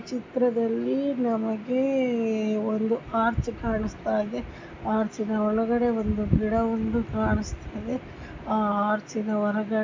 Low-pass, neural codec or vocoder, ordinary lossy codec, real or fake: 7.2 kHz; none; MP3, 64 kbps; real